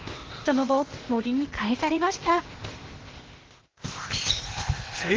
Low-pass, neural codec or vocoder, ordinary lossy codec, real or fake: 7.2 kHz; codec, 16 kHz, 0.8 kbps, ZipCodec; Opus, 16 kbps; fake